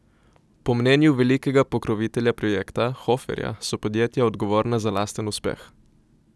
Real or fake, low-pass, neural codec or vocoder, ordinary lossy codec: real; none; none; none